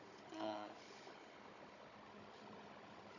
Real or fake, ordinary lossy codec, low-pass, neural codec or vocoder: fake; none; 7.2 kHz; codec, 16 kHz, 16 kbps, FunCodec, trained on Chinese and English, 50 frames a second